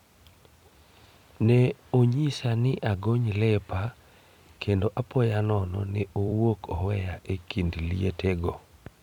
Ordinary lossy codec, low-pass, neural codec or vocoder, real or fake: none; 19.8 kHz; vocoder, 44.1 kHz, 128 mel bands every 256 samples, BigVGAN v2; fake